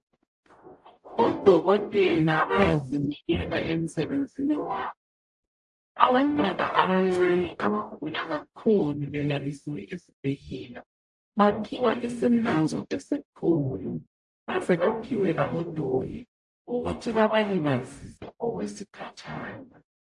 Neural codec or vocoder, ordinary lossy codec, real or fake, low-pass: codec, 44.1 kHz, 0.9 kbps, DAC; AAC, 64 kbps; fake; 10.8 kHz